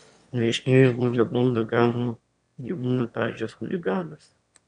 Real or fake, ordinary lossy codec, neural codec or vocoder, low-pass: fake; MP3, 96 kbps; autoencoder, 22.05 kHz, a latent of 192 numbers a frame, VITS, trained on one speaker; 9.9 kHz